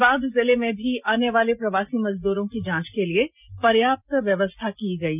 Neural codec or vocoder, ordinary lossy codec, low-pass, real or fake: none; none; 3.6 kHz; real